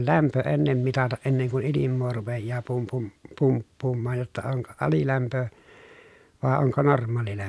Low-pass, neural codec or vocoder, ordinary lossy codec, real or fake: none; none; none; real